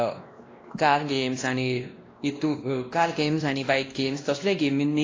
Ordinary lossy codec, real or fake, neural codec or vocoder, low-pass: AAC, 32 kbps; fake; codec, 16 kHz, 1 kbps, X-Codec, WavLM features, trained on Multilingual LibriSpeech; 7.2 kHz